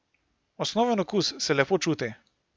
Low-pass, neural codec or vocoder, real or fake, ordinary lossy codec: none; none; real; none